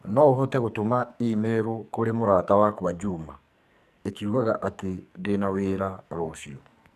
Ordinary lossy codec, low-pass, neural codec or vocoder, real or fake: none; 14.4 kHz; codec, 44.1 kHz, 2.6 kbps, SNAC; fake